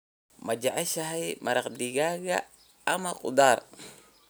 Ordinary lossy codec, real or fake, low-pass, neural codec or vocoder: none; real; none; none